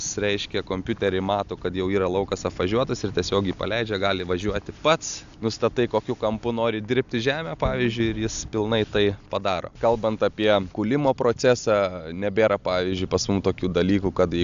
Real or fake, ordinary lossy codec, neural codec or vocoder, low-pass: real; AAC, 96 kbps; none; 7.2 kHz